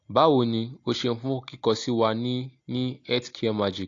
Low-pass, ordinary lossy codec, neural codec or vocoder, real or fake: 7.2 kHz; AAC, 48 kbps; none; real